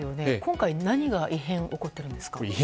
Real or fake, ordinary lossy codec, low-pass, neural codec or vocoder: real; none; none; none